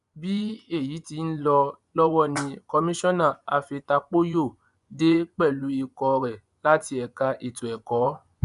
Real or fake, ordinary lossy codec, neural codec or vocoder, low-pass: fake; none; vocoder, 24 kHz, 100 mel bands, Vocos; 10.8 kHz